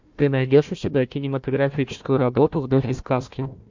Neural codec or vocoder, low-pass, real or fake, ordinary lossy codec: codec, 16 kHz, 1 kbps, FunCodec, trained on Chinese and English, 50 frames a second; 7.2 kHz; fake; MP3, 64 kbps